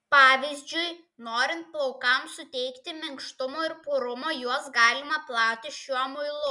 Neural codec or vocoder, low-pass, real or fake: none; 10.8 kHz; real